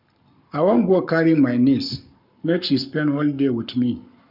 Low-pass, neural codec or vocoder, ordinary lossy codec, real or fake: 5.4 kHz; codec, 44.1 kHz, 7.8 kbps, Pupu-Codec; none; fake